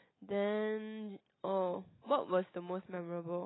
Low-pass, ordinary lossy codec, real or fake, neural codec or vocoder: 7.2 kHz; AAC, 16 kbps; real; none